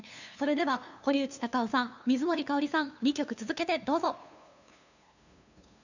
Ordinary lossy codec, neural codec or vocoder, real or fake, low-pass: none; codec, 16 kHz, 2 kbps, FunCodec, trained on LibriTTS, 25 frames a second; fake; 7.2 kHz